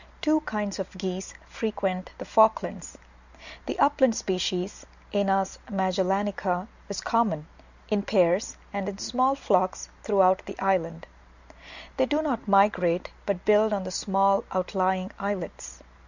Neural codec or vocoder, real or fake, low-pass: none; real; 7.2 kHz